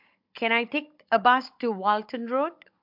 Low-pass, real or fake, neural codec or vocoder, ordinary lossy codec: 5.4 kHz; fake; codec, 16 kHz, 16 kbps, FunCodec, trained on Chinese and English, 50 frames a second; MP3, 48 kbps